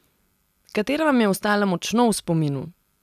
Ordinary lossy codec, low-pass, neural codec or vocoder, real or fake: none; 14.4 kHz; none; real